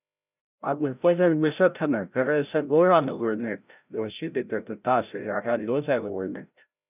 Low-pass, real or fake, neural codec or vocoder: 3.6 kHz; fake; codec, 16 kHz, 0.5 kbps, FreqCodec, larger model